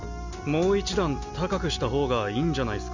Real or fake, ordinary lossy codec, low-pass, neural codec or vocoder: real; none; 7.2 kHz; none